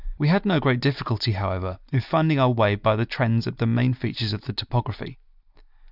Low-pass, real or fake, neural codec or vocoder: 5.4 kHz; real; none